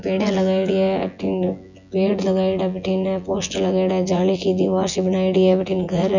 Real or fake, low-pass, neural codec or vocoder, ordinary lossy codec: fake; 7.2 kHz; vocoder, 24 kHz, 100 mel bands, Vocos; none